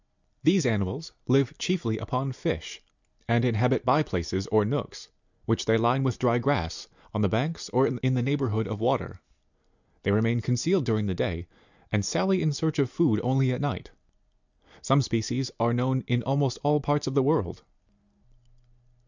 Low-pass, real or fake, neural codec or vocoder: 7.2 kHz; real; none